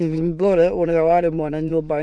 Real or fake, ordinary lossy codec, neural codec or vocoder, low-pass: fake; none; autoencoder, 22.05 kHz, a latent of 192 numbers a frame, VITS, trained on many speakers; 9.9 kHz